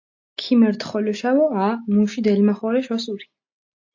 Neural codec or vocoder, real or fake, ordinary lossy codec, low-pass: none; real; AAC, 48 kbps; 7.2 kHz